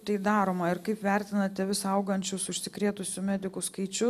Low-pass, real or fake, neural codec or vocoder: 14.4 kHz; real; none